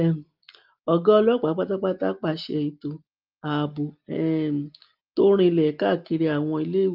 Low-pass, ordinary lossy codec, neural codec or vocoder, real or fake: 5.4 kHz; Opus, 24 kbps; none; real